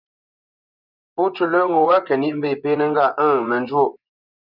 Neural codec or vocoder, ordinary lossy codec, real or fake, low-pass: vocoder, 24 kHz, 100 mel bands, Vocos; Opus, 64 kbps; fake; 5.4 kHz